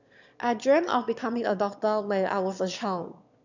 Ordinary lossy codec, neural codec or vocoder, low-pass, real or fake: none; autoencoder, 22.05 kHz, a latent of 192 numbers a frame, VITS, trained on one speaker; 7.2 kHz; fake